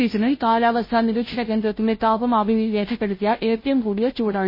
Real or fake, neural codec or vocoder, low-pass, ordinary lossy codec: fake; codec, 16 kHz, 0.5 kbps, FunCodec, trained on Chinese and English, 25 frames a second; 5.4 kHz; MP3, 24 kbps